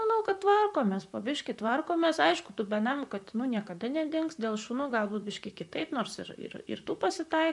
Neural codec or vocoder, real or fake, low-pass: vocoder, 44.1 kHz, 128 mel bands, Pupu-Vocoder; fake; 10.8 kHz